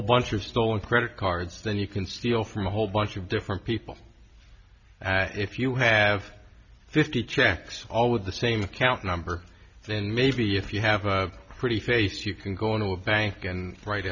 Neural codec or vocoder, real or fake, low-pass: none; real; 7.2 kHz